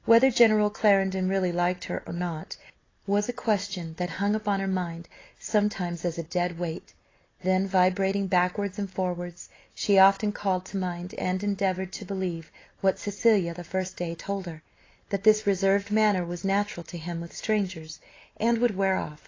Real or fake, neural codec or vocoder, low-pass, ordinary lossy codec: real; none; 7.2 kHz; AAC, 32 kbps